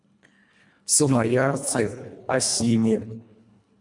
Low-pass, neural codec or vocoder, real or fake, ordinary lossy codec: 10.8 kHz; codec, 24 kHz, 1.5 kbps, HILCodec; fake; MP3, 96 kbps